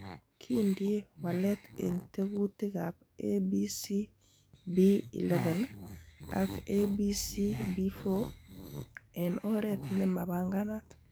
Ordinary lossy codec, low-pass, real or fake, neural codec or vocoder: none; none; fake; codec, 44.1 kHz, 7.8 kbps, DAC